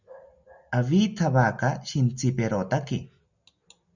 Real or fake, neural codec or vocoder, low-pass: real; none; 7.2 kHz